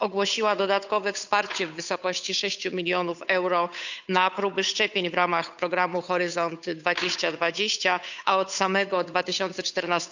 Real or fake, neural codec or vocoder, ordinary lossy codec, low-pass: fake; codec, 16 kHz, 8 kbps, FunCodec, trained on Chinese and English, 25 frames a second; none; 7.2 kHz